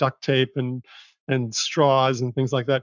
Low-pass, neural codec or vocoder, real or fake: 7.2 kHz; codec, 44.1 kHz, 7.8 kbps, Pupu-Codec; fake